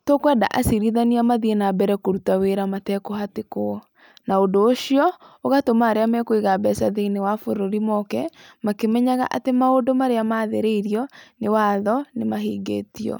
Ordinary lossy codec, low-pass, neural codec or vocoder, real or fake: none; none; none; real